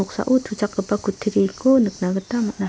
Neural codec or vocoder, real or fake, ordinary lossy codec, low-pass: none; real; none; none